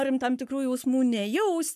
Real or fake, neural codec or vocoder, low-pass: fake; codec, 44.1 kHz, 7.8 kbps, Pupu-Codec; 14.4 kHz